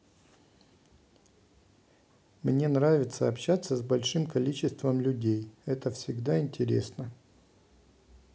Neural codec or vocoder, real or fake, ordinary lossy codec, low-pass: none; real; none; none